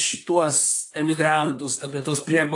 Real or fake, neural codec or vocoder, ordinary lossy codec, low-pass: fake; codec, 24 kHz, 1 kbps, SNAC; AAC, 64 kbps; 10.8 kHz